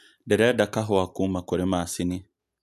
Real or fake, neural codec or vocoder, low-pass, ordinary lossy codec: real; none; 14.4 kHz; none